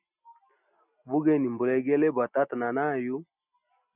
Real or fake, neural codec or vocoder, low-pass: real; none; 3.6 kHz